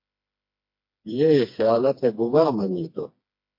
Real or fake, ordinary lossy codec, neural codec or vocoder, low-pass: fake; MP3, 32 kbps; codec, 16 kHz, 2 kbps, FreqCodec, smaller model; 5.4 kHz